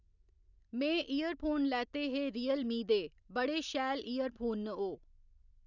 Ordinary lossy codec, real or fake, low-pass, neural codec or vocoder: none; real; 7.2 kHz; none